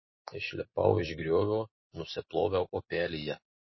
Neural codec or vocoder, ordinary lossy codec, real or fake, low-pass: none; MP3, 24 kbps; real; 7.2 kHz